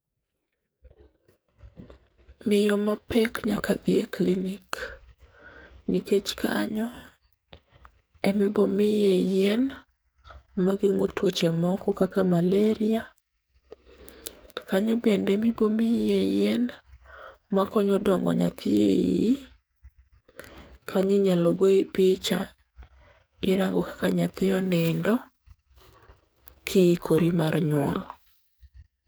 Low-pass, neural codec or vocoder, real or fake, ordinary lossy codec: none; codec, 44.1 kHz, 2.6 kbps, SNAC; fake; none